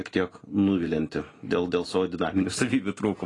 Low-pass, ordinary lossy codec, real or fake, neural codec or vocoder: 10.8 kHz; AAC, 32 kbps; real; none